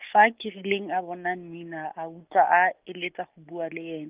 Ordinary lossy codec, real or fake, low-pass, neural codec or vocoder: Opus, 24 kbps; real; 3.6 kHz; none